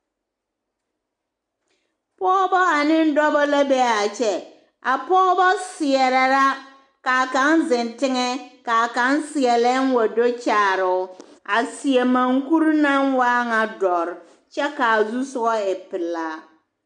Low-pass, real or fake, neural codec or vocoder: 10.8 kHz; real; none